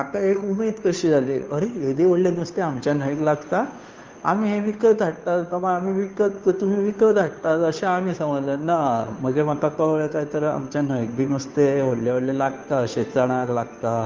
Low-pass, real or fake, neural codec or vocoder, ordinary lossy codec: 7.2 kHz; fake; codec, 16 kHz, 2 kbps, FunCodec, trained on Chinese and English, 25 frames a second; Opus, 32 kbps